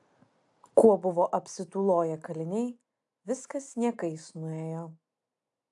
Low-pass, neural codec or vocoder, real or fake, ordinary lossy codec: 10.8 kHz; none; real; AAC, 64 kbps